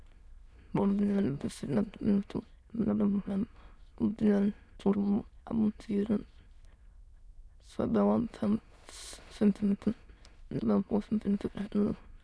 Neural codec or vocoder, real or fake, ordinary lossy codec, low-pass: autoencoder, 22.05 kHz, a latent of 192 numbers a frame, VITS, trained on many speakers; fake; none; none